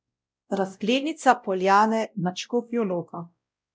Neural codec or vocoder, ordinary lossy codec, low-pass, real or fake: codec, 16 kHz, 0.5 kbps, X-Codec, WavLM features, trained on Multilingual LibriSpeech; none; none; fake